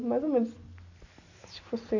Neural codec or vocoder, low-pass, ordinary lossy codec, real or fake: none; 7.2 kHz; none; real